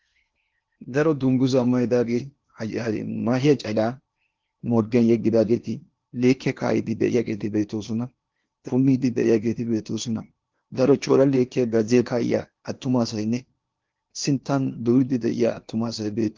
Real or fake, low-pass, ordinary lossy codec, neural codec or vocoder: fake; 7.2 kHz; Opus, 24 kbps; codec, 16 kHz in and 24 kHz out, 0.8 kbps, FocalCodec, streaming, 65536 codes